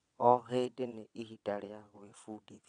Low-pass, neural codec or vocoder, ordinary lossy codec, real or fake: 9.9 kHz; vocoder, 22.05 kHz, 80 mel bands, WaveNeXt; none; fake